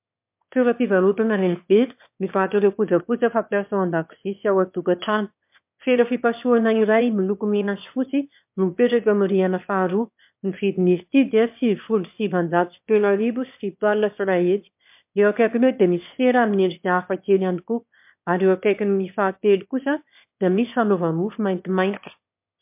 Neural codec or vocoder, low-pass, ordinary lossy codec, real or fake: autoencoder, 22.05 kHz, a latent of 192 numbers a frame, VITS, trained on one speaker; 3.6 kHz; MP3, 32 kbps; fake